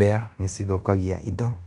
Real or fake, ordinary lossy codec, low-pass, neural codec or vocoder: fake; none; 10.8 kHz; codec, 16 kHz in and 24 kHz out, 0.9 kbps, LongCat-Audio-Codec, fine tuned four codebook decoder